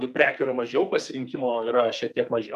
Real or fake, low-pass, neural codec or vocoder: fake; 14.4 kHz; codec, 44.1 kHz, 2.6 kbps, SNAC